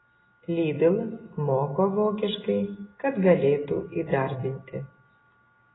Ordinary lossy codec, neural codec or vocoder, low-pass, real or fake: AAC, 16 kbps; none; 7.2 kHz; real